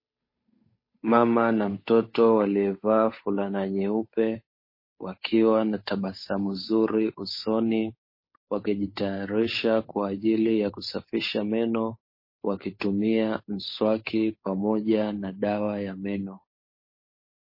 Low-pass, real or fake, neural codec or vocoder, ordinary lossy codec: 5.4 kHz; fake; codec, 16 kHz, 8 kbps, FunCodec, trained on Chinese and English, 25 frames a second; MP3, 24 kbps